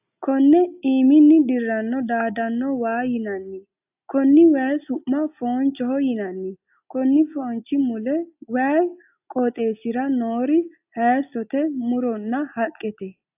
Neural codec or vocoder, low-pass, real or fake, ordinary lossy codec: none; 3.6 kHz; real; AAC, 32 kbps